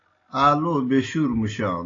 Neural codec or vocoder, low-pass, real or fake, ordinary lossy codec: none; 7.2 kHz; real; AAC, 32 kbps